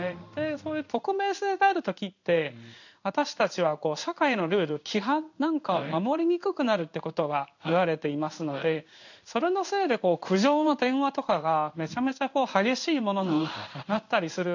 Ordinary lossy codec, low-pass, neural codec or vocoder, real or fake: none; 7.2 kHz; codec, 16 kHz in and 24 kHz out, 1 kbps, XY-Tokenizer; fake